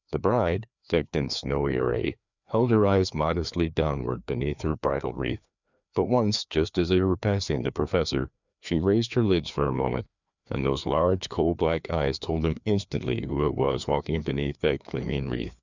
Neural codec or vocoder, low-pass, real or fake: codec, 16 kHz, 2 kbps, FreqCodec, larger model; 7.2 kHz; fake